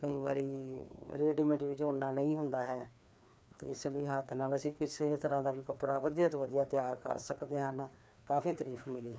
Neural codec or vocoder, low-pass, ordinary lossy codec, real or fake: codec, 16 kHz, 2 kbps, FreqCodec, larger model; none; none; fake